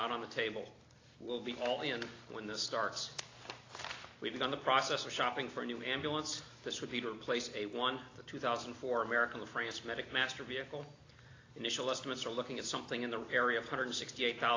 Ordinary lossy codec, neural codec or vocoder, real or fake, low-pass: AAC, 32 kbps; none; real; 7.2 kHz